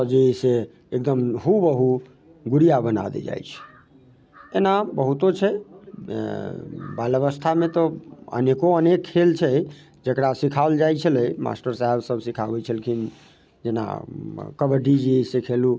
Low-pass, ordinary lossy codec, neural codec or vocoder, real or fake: none; none; none; real